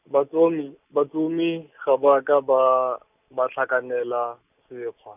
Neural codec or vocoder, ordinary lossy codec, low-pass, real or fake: none; none; 3.6 kHz; real